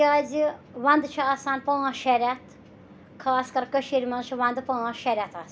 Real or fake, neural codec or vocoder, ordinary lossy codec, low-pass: real; none; none; none